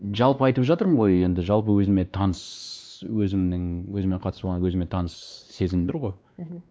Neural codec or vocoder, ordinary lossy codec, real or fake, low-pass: codec, 16 kHz, 2 kbps, X-Codec, WavLM features, trained on Multilingual LibriSpeech; none; fake; none